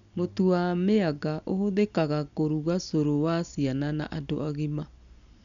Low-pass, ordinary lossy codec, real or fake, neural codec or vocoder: 7.2 kHz; none; real; none